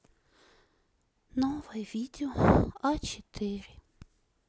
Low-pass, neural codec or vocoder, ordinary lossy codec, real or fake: none; none; none; real